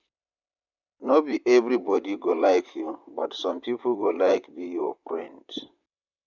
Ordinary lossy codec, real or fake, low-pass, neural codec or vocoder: none; fake; 7.2 kHz; vocoder, 44.1 kHz, 128 mel bands, Pupu-Vocoder